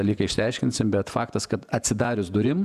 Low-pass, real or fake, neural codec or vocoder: 14.4 kHz; fake; vocoder, 48 kHz, 128 mel bands, Vocos